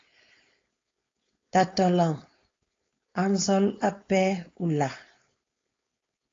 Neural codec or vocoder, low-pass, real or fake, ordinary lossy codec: codec, 16 kHz, 4.8 kbps, FACodec; 7.2 kHz; fake; AAC, 32 kbps